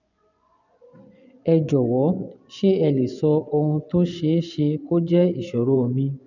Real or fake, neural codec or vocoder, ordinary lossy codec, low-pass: fake; vocoder, 24 kHz, 100 mel bands, Vocos; none; 7.2 kHz